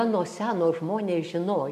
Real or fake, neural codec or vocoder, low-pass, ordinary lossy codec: real; none; 14.4 kHz; MP3, 96 kbps